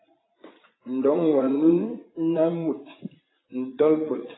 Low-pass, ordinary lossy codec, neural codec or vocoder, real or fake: 7.2 kHz; AAC, 16 kbps; vocoder, 22.05 kHz, 80 mel bands, Vocos; fake